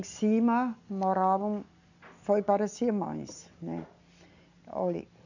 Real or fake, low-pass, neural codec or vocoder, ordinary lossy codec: real; 7.2 kHz; none; none